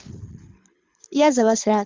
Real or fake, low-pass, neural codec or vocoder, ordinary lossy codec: fake; 7.2 kHz; codec, 24 kHz, 6 kbps, HILCodec; Opus, 32 kbps